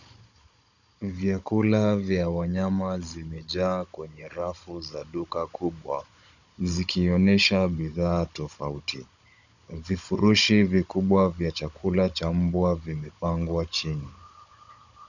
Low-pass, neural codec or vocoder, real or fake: 7.2 kHz; codec, 16 kHz, 16 kbps, FunCodec, trained on Chinese and English, 50 frames a second; fake